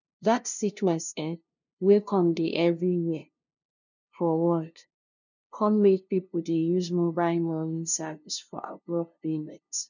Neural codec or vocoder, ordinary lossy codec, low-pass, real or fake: codec, 16 kHz, 0.5 kbps, FunCodec, trained on LibriTTS, 25 frames a second; none; 7.2 kHz; fake